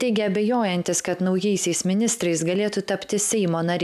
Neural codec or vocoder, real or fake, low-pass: none; real; 14.4 kHz